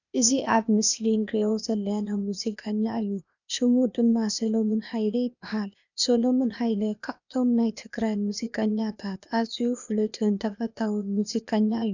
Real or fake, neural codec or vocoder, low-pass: fake; codec, 16 kHz, 0.8 kbps, ZipCodec; 7.2 kHz